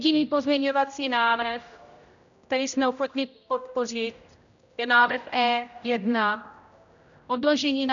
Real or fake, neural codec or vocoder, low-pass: fake; codec, 16 kHz, 0.5 kbps, X-Codec, HuBERT features, trained on general audio; 7.2 kHz